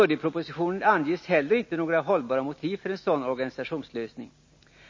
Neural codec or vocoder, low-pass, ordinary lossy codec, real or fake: none; 7.2 kHz; MP3, 32 kbps; real